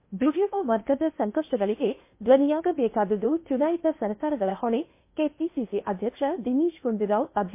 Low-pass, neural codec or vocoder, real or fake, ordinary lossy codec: 3.6 kHz; codec, 16 kHz in and 24 kHz out, 0.8 kbps, FocalCodec, streaming, 65536 codes; fake; MP3, 24 kbps